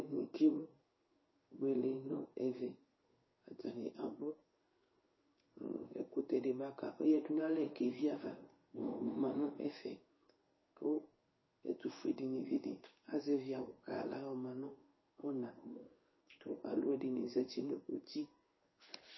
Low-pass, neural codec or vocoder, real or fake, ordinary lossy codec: 7.2 kHz; codec, 16 kHz in and 24 kHz out, 1 kbps, XY-Tokenizer; fake; MP3, 24 kbps